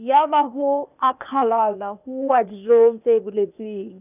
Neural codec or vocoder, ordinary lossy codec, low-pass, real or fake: codec, 16 kHz, 0.8 kbps, ZipCodec; none; 3.6 kHz; fake